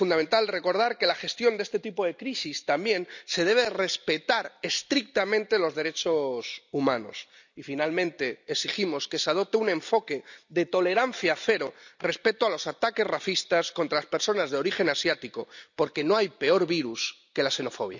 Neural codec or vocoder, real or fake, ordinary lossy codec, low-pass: none; real; none; 7.2 kHz